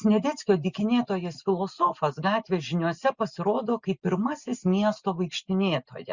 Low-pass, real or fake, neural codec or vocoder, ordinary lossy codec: 7.2 kHz; real; none; Opus, 64 kbps